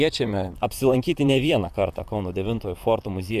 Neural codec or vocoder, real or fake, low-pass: vocoder, 44.1 kHz, 128 mel bands, Pupu-Vocoder; fake; 14.4 kHz